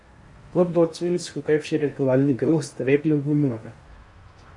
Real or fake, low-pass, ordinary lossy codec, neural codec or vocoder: fake; 10.8 kHz; MP3, 48 kbps; codec, 16 kHz in and 24 kHz out, 0.6 kbps, FocalCodec, streaming, 4096 codes